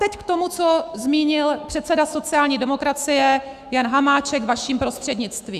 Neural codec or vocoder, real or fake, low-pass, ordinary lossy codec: autoencoder, 48 kHz, 128 numbers a frame, DAC-VAE, trained on Japanese speech; fake; 14.4 kHz; Opus, 64 kbps